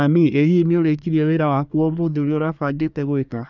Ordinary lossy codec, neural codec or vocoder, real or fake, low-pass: none; codec, 24 kHz, 1 kbps, SNAC; fake; 7.2 kHz